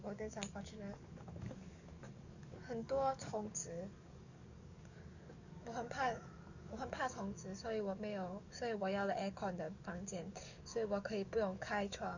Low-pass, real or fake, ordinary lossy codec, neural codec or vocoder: 7.2 kHz; fake; none; codec, 44.1 kHz, 7.8 kbps, DAC